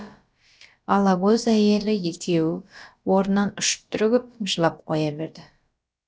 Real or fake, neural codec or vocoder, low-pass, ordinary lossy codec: fake; codec, 16 kHz, about 1 kbps, DyCAST, with the encoder's durations; none; none